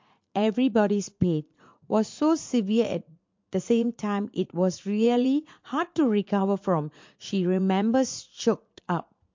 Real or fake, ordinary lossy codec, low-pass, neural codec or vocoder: fake; MP3, 48 kbps; 7.2 kHz; vocoder, 44.1 kHz, 80 mel bands, Vocos